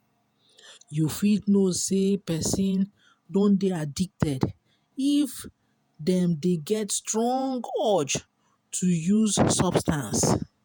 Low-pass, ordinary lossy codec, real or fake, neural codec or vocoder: none; none; fake; vocoder, 48 kHz, 128 mel bands, Vocos